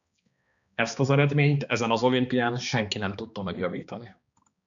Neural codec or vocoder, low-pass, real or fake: codec, 16 kHz, 2 kbps, X-Codec, HuBERT features, trained on balanced general audio; 7.2 kHz; fake